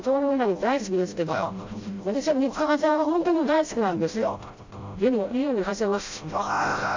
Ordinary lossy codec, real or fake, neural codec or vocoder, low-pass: AAC, 48 kbps; fake; codec, 16 kHz, 0.5 kbps, FreqCodec, smaller model; 7.2 kHz